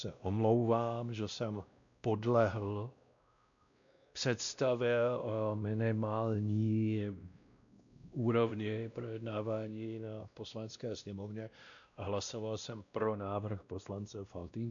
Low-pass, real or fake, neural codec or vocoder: 7.2 kHz; fake; codec, 16 kHz, 1 kbps, X-Codec, WavLM features, trained on Multilingual LibriSpeech